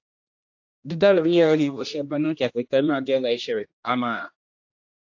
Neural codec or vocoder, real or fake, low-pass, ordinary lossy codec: codec, 16 kHz, 1 kbps, X-Codec, HuBERT features, trained on general audio; fake; 7.2 kHz; AAC, 48 kbps